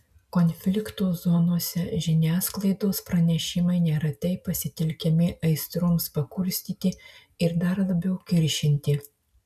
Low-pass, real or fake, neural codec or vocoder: 14.4 kHz; real; none